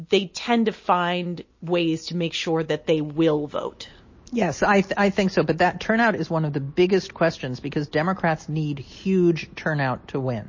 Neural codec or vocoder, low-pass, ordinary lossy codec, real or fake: none; 7.2 kHz; MP3, 32 kbps; real